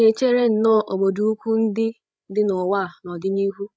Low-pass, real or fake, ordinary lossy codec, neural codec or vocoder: none; fake; none; codec, 16 kHz, 16 kbps, FreqCodec, larger model